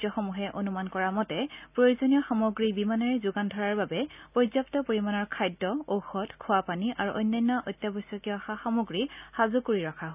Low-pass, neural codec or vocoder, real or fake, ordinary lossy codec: 3.6 kHz; none; real; none